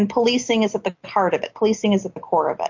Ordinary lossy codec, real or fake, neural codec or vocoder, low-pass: MP3, 48 kbps; real; none; 7.2 kHz